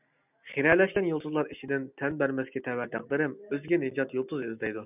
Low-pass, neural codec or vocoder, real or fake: 3.6 kHz; none; real